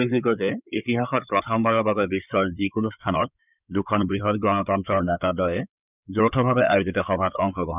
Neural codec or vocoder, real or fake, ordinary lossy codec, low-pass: codec, 16 kHz in and 24 kHz out, 2.2 kbps, FireRedTTS-2 codec; fake; none; 3.6 kHz